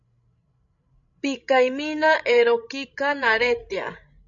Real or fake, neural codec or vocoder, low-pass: fake; codec, 16 kHz, 16 kbps, FreqCodec, larger model; 7.2 kHz